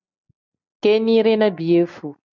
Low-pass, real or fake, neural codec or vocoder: 7.2 kHz; real; none